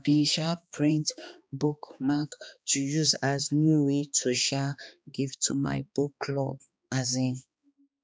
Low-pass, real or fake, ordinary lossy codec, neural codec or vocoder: none; fake; none; codec, 16 kHz, 2 kbps, X-Codec, HuBERT features, trained on balanced general audio